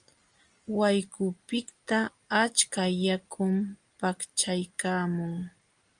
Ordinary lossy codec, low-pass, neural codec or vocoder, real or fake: Opus, 32 kbps; 9.9 kHz; none; real